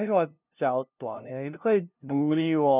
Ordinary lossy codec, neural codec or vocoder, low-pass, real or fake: none; codec, 16 kHz, 0.5 kbps, FunCodec, trained on LibriTTS, 25 frames a second; 3.6 kHz; fake